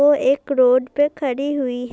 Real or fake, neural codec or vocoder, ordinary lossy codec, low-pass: real; none; none; none